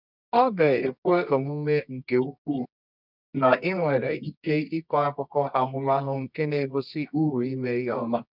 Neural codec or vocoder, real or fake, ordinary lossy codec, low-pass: codec, 24 kHz, 0.9 kbps, WavTokenizer, medium music audio release; fake; none; 5.4 kHz